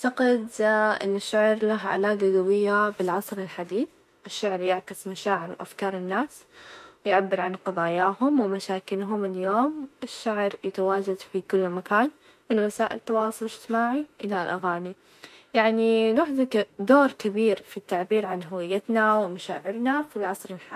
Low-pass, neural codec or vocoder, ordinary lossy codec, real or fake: 14.4 kHz; autoencoder, 48 kHz, 32 numbers a frame, DAC-VAE, trained on Japanese speech; MP3, 64 kbps; fake